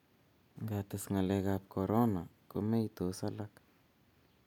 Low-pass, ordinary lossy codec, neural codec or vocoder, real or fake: 19.8 kHz; none; none; real